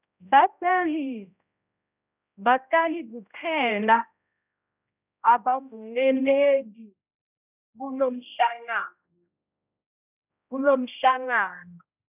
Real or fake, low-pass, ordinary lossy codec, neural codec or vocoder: fake; 3.6 kHz; none; codec, 16 kHz, 0.5 kbps, X-Codec, HuBERT features, trained on general audio